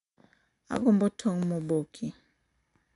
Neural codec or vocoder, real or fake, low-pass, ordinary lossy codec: none; real; 10.8 kHz; none